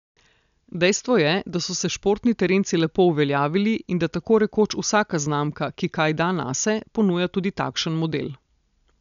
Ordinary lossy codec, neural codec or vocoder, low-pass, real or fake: none; none; 7.2 kHz; real